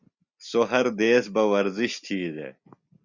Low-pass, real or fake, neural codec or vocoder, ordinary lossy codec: 7.2 kHz; real; none; Opus, 64 kbps